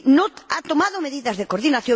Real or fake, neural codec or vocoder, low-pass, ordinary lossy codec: real; none; none; none